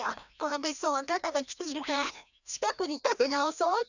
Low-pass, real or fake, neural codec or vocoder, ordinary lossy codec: 7.2 kHz; fake; codec, 16 kHz, 1 kbps, FreqCodec, larger model; none